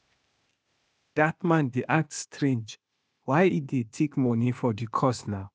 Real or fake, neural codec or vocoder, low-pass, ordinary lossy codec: fake; codec, 16 kHz, 0.8 kbps, ZipCodec; none; none